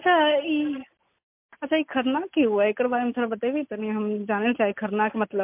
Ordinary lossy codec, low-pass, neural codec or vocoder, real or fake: MP3, 32 kbps; 3.6 kHz; none; real